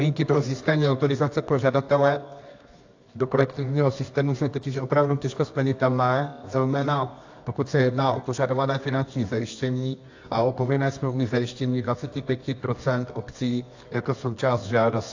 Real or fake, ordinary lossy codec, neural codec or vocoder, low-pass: fake; AAC, 48 kbps; codec, 24 kHz, 0.9 kbps, WavTokenizer, medium music audio release; 7.2 kHz